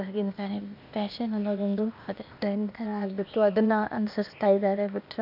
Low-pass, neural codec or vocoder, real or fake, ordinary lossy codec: 5.4 kHz; codec, 16 kHz, 0.8 kbps, ZipCodec; fake; none